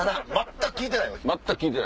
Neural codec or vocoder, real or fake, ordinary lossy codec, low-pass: none; real; none; none